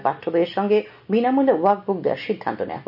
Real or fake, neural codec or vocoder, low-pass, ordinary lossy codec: real; none; 5.4 kHz; none